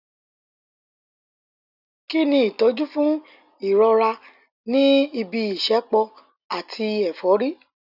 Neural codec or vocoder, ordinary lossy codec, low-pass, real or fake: none; none; 5.4 kHz; real